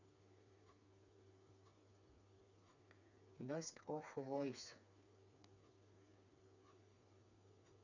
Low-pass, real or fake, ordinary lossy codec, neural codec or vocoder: 7.2 kHz; fake; none; codec, 16 kHz, 4 kbps, FreqCodec, smaller model